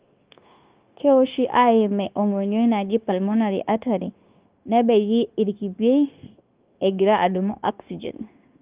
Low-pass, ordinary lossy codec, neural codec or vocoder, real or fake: 3.6 kHz; Opus, 32 kbps; codec, 16 kHz, 0.9 kbps, LongCat-Audio-Codec; fake